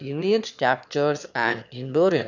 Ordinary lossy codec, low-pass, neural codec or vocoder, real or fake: none; 7.2 kHz; autoencoder, 22.05 kHz, a latent of 192 numbers a frame, VITS, trained on one speaker; fake